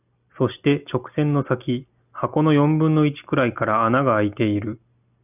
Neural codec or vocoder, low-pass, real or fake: none; 3.6 kHz; real